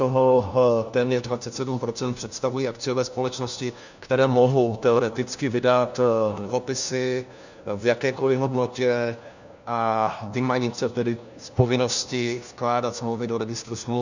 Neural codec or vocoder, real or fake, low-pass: codec, 16 kHz, 1 kbps, FunCodec, trained on LibriTTS, 50 frames a second; fake; 7.2 kHz